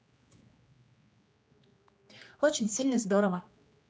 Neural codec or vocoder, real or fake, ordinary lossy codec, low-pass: codec, 16 kHz, 2 kbps, X-Codec, HuBERT features, trained on general audio; fake; none; none